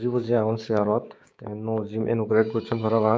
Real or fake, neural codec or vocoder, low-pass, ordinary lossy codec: fake; codec, 16 kHz, 6 kbps, DAC; none; none